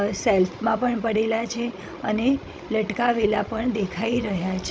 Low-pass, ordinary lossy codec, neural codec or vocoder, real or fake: none; none; codec, 16 kHz, 16 kbps, FreqCodec, larger model; fake